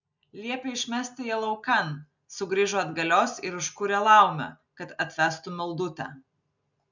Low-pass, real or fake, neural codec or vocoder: 7.2 kHz; real; none